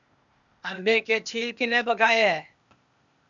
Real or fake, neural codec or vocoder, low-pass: fake; codec, 16 kHz, 0.8 kbps, ZipCodec; 7.2 kHz